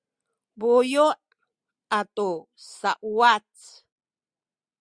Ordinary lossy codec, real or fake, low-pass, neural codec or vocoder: Opus, 64 kbps; real; 9.9 kHz; none